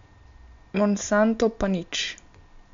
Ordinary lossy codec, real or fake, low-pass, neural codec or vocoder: MP3, 64 kbps; real; 7.2 kHz; none